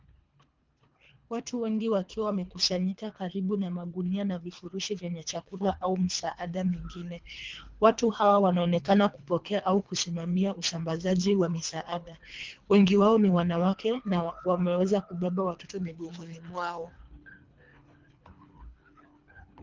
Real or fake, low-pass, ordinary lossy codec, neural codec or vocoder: fake; 7.2 kHz; Opus, 32 kbps; codec, 24 kHz, 3 kbps, HILCodec